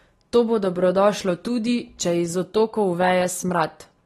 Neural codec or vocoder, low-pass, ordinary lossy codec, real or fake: none; 19.8 kHz; AAC, 32 kbps; real